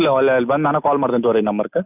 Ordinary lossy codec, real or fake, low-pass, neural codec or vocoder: none; real; 3.6 kHz; none